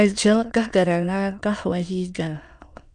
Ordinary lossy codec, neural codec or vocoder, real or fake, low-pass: MP3, 96 kbps; autoencoder, 22.05 kHz, a latent of 192 numbers a frame, VITS, trained on many speakers; fake; 9.9 kHz